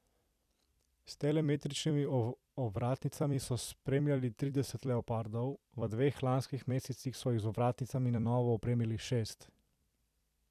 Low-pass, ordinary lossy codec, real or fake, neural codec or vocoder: 14.4 kHz; none; fake; vocoder, 44.1 kHz, 128 mel bands every 256 samples, BigVGAN v2